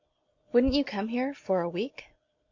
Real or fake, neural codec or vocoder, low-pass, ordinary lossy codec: real; none; 7.2 kHz; MP3, 48 kbps